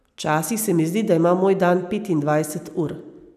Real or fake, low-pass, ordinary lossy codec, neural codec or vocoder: real; 14.4 kHz; none; none